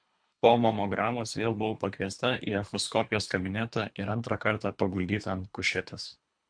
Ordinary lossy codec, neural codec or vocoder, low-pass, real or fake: MP3, 64 kbps; codec, 24 kHz, 3 kbps, HILCodec; 9.9 kHz; fake